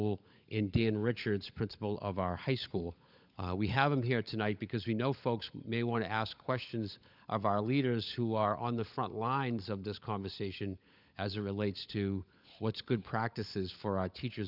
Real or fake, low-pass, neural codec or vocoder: fake; 5.4 kHz; codec, 16 kHz, 16 kbps, FunCodec, trained on LibriTTS, 50 frames a second